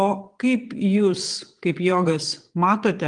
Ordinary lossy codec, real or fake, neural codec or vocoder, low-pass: Opus, 24 kbps; fake; vocoder, 22.05 kHz, 80 mel bands, WaveNeXt; 9.9 kHz